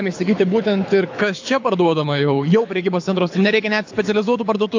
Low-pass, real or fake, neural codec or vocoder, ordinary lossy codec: 7.2 kHz; fake; codec, 24 kHz, 6 kbps, HILCodec; AAC, 48 kbps